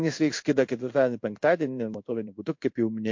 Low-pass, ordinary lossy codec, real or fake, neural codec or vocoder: 7.2 kHz; MP3, 48 kbps; fake; codec, 16 kHz in and 24 kHz out, 1 kbps, XY-Tokenizer